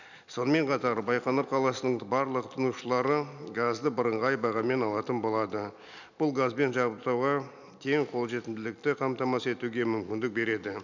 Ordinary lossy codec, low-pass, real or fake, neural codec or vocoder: none; 7.2 kHz; real; none